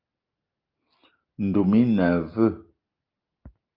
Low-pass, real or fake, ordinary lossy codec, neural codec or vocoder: 5.4 kHz; real; Opus, 24 kbps; none